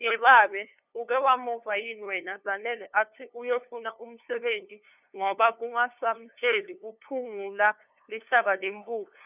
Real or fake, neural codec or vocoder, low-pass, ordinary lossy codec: fake; codec, 16 kHz, 2 kbps, FunCodec, trained on LibriTTS, 25 frames a second; 3.6 kHz; none